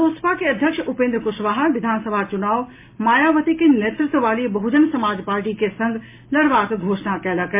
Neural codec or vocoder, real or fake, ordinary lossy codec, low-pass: none; real; MP3, 24 kbps; 3.6 kHz